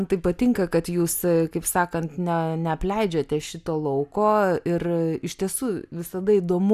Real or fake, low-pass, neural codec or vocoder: real; 14.4 kHz; none